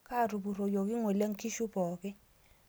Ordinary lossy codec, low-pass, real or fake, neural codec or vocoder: none; none; real; none